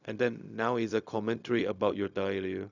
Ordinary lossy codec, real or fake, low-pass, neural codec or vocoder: none; fake; 7.2 kHz; codec, 16 kHz, 0.4 kbps, LongCat-Audio-Codec